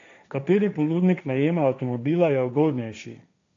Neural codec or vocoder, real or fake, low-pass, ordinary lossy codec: codec, 16 kHz, 1.1 kbps, Voila-Tokenizer; fake; 7.2 kHz; AAC, 48 kbps